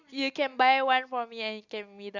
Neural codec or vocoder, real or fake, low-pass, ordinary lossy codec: none; real; 7.2 kHz; Opus, 64 kbps